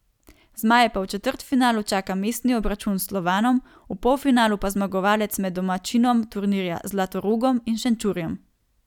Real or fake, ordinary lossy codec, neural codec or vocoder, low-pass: real; none; none; 19.8 kHz